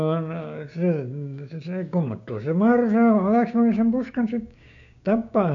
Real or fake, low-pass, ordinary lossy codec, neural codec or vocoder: fake; 7.2 kHz; none; codec, 16 kHz, 6 kbps, DAC